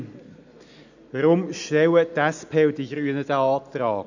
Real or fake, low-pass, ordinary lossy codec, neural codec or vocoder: real; 7.2 kHz; none; none